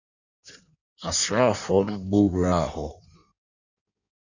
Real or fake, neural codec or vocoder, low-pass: fake; codec, 16 kHz in and 24 kHz out, 1.1 kbps, FireRedTTS-2 codec; 7.2 kHz